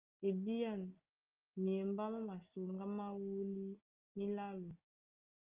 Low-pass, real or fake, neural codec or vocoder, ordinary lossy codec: 3.6 kHz; real; none; Opus, 32 kbps